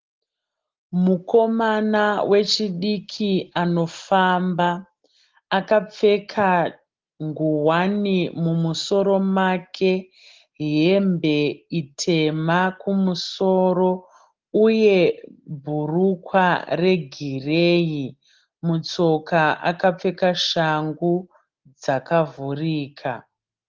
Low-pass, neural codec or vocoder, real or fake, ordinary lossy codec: 7.2 kHz; none; real; Opus, 16 kbps